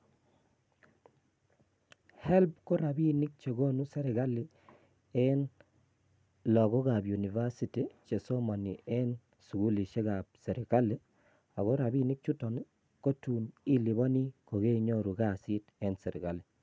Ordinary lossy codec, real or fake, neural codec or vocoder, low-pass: none; real; none; none